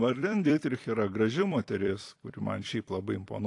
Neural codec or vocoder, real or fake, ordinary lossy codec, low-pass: vocoder, 44.1 kHz, 128 mel bands every 256 samples, BigVGAN v2; fake; AAC, 48 kbps; 10.8 kHz